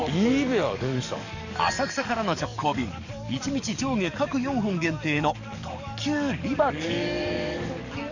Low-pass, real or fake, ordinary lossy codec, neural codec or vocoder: 7.2 kHz; fake; none; codec, 44.1 kHz, 7.8 kbps, DAC